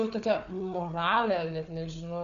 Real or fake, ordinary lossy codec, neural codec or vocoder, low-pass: fake; MP3, 96 kbps; codec, 16 kHz, 4 kbps, FunCodec, trained on Chinese and English, 50 frames a second; 7.2 kHz